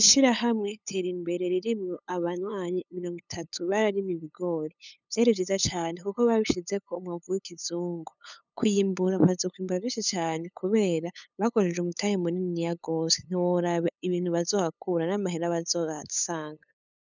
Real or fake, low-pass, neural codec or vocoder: fake; 7.2 kHz; codec, 16 kHz, 8 kbps, FunCodec, trained on LibriTTS, 25 frames a second